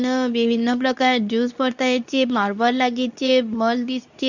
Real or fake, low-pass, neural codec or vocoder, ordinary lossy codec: fake; 7.2 kHz; codec, 24 kHz, 0.9 kbps, WavTokenizer, medium speech release version 2; none